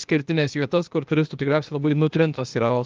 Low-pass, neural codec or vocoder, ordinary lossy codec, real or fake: 7.2 kHz; codec, 16 kHz, 0.8 kbps, ZipCodec; Opus, 24 kbps; fake